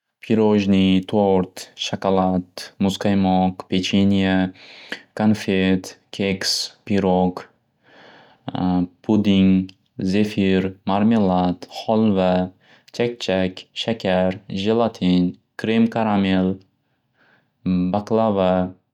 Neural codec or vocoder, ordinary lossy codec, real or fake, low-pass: none; none; real; 19.8 kHz